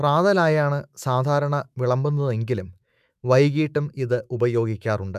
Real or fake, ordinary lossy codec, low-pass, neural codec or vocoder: fake; none; 14.4 kHz; autoencoder, 48 kHz, 128 numbers a frame, DAC-VAE, trained on Japanese speech